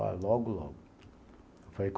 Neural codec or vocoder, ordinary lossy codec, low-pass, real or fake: none; none; none; real